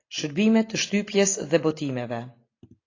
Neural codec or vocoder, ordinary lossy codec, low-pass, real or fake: none; AAC, 32 kbps; 7.2 kHz; real